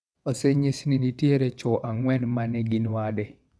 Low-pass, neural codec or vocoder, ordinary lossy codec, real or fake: none; vocoder, 22.05 kHz, 80 mel bands, WaveNeXt; none; fake